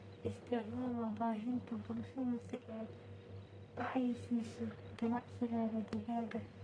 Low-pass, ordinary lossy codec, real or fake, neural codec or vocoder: 9.9 kHz; none; fake; codec, 44.1 kHz, 1.7 kbps, Pupu-Codec